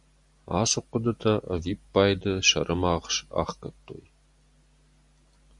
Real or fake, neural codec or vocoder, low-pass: real; none; 10.8 kHz